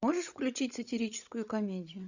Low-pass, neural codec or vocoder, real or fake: 7.2 kHz; codec, 16 kHz, 16 kbps, FreqCodec, larger model; fake